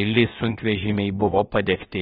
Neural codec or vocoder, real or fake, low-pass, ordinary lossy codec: codec, 16 kHz, about 1 kbps, DyCAST, with the encoder's durations; fake; 7.2 kHz; AAC, 16 kbps